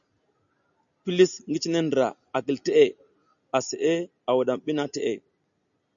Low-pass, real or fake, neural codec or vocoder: 7.2 kHz; real; none